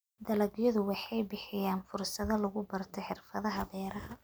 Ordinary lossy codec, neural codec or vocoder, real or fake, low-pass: none; none; real; none